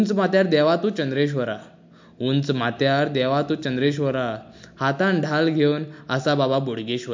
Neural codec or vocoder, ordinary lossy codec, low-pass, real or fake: none; MP3, 48 kbps; 7.2 kHz; real